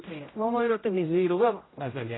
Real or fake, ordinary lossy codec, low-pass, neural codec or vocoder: fake; AAC, 16 kbps; 7.2 kHz; codec, 16 kHz, 0.5 kbps, X-Codec, HuBERT features, trained on general audio